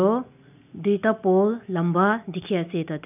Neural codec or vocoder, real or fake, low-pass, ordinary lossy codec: none; real; 3.6 kHz; none